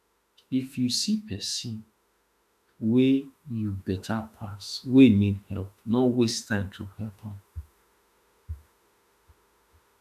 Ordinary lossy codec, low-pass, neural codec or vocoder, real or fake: none; 14.4 kHz; autoencoder, 48 kHz, 32 numbers a frame, DAC-VAE, trained on Japanese speech; fake